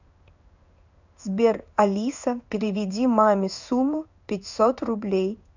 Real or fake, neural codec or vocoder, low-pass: fake; codec, 16 kHz in and 24 kHz out, 1 kbps, XY-Tokenizer; 7.2 kHz